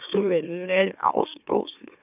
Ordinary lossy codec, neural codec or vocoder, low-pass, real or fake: none; autoencoder, 44.1 kHz, a latent of 192 numbers a frame, MeloTTS; 3.6 kHz; fake